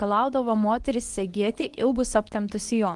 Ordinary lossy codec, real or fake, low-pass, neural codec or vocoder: Opus, 24 kbps; fake; 10.8 kHz; codec, 24 kHz, 0.9 kbps, WavTokenizer, medium speech release version 2